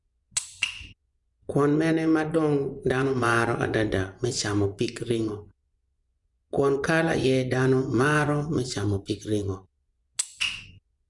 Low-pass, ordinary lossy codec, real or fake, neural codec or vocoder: 10.8 kHz; none; fake; vocoder, 24 kHz, 100 mel bands, Vocos